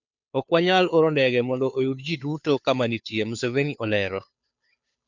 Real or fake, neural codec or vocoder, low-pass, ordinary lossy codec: fake; codec, 16 kHz, 2 kbps, FunCodec, trained on Chinese and English, 25 frames a second; 7.2 kHz; none